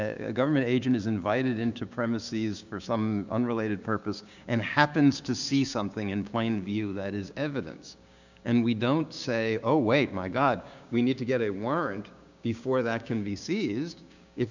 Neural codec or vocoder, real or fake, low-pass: codec, 16 kHz, 6 kbps, DAC; fake; 7.2 kHz